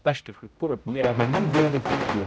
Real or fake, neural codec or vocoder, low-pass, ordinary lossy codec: fake; codec, 16 kHz, 0.5 kbps, X-Codec, HuBERT features, trained on general audio; none; none